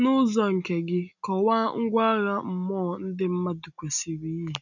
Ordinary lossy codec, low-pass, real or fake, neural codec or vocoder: none; 7.2 kHz; real; none